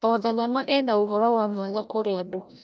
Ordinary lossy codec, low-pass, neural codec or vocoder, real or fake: none; none; codec, 16 kHz, 0.5 kbps, FreqCodec, larger model; fake